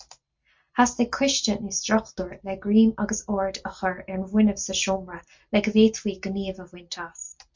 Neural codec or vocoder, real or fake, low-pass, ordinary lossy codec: none; real; 7.2 kHz; MP3, 48 kbps